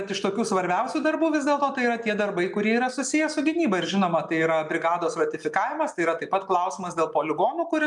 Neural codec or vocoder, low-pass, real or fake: none; 10.8 kHz; real